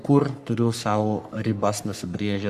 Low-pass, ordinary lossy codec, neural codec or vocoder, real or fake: 14.4 kHz; Opus, 64 kbps; codec, 44.1 kHz, 3.4 kbps, Pupu-Codec; fake